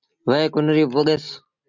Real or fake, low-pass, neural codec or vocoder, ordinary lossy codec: real; 7.2 kHz; none; MP3, 64 kbps